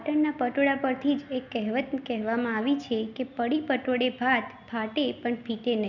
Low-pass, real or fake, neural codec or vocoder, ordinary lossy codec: 7.2 kHz; real; none; none